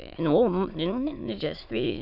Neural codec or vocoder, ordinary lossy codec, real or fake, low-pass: autoencoder, 22.05 kHz, a latent of 192 numbers a frame, VITS, trained on many speakers; none; fake; 5.4 kHz